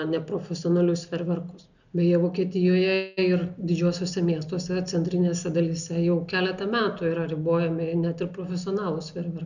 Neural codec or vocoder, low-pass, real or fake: none; 7.2 kHz; real